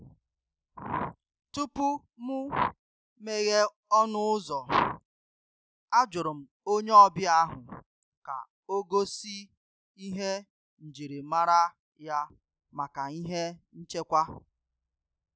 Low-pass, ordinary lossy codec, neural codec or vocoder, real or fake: none; none; none; real